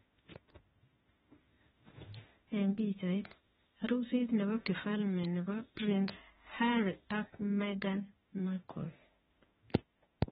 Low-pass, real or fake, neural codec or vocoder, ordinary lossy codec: 19.8 kHz; fake; autoencoder, 48 kHz, 32 numbers a frame, DAC-VAE, trained on Japanese speech; AAC, 16 kbps